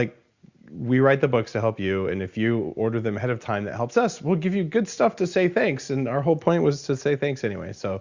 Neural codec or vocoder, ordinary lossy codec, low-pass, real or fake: none; Opus, 64 kbps; 7.2 kHz; real